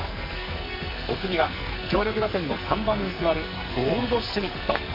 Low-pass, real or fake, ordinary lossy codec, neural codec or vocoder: 5.4 kHz; fake; MP3, 32 kbps; codec, 44.1 kHz, 2.6 kbps, SNAC